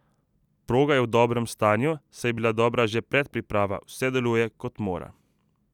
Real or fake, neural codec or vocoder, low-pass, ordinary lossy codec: real; none; 19.8 kHz; none